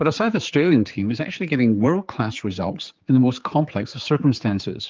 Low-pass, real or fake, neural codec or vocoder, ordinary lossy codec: 7.2 kHz; fake; codec, 16 kHz, 4 kbps, X-Codec, HuBERT features, trained on general audio; Opus, 24 kbps